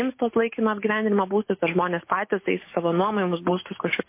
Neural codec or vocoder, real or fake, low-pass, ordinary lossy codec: none; real; 3.6 kHz; MP3, 24 kbps